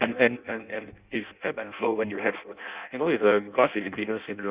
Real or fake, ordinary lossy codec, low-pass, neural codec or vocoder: fake; Opus, 64 kbps; 3.6 kHz; codec, 16 kHz in and 24 kHz out, 0.6 kbps, FireRedTTS-2 codec